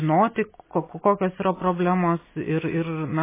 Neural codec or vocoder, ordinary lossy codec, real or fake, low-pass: none; MP3, 16 kbps; real; 3.6 kHz